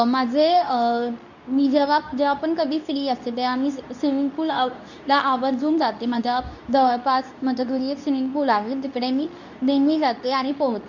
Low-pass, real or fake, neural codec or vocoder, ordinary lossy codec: 7.2 kHz; fake; codec, 24 kHz, 0.9 kbps, WavTokenizer, medium speech release version 2; none